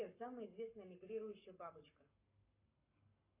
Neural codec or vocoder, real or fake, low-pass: codec, 44.1 kHz, 7.8 kbps, Pupu-Codec; fake; 3.6 kHz